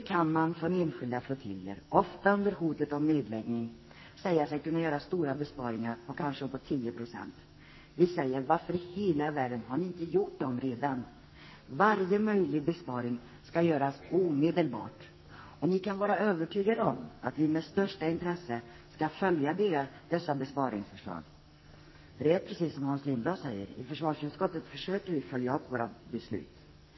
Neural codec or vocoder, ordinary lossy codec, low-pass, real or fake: codec, 44.1 kHz, 2.6 kbps, SNAC; MP3, 24 kbps; 7.2 kHz; fake